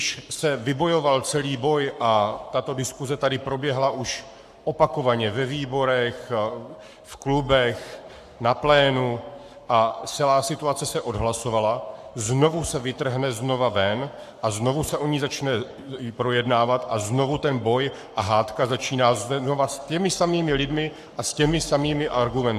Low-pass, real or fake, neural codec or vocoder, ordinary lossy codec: 14.4 kHz; fake; codec, 44.1 kHz, 7.8 kbps, Pupu-Codec; AAC, 96 kbps